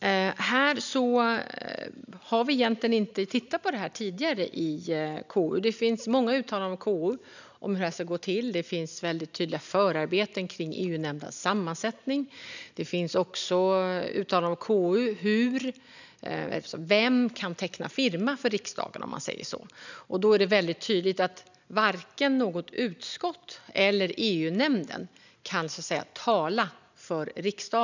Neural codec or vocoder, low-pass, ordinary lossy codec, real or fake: none; 7.2 kHz; none; real